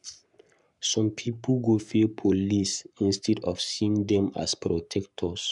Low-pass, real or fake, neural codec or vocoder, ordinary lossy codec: 10.8 kHz; fake; codec, 44.1 kHz, 7.8 kbps, Pupu-Codec; none